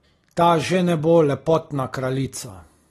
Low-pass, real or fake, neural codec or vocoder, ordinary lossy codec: 19.8 kHz; real; none; AAC, 32 kbps